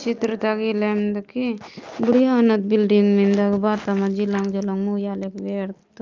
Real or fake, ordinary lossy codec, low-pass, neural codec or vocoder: real; Opus, 24 kbps; 7.2 kHz; none